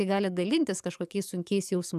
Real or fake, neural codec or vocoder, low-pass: fake; codec, 44.1 kHz, 7.8 kbps, DAC; 14.4 kHz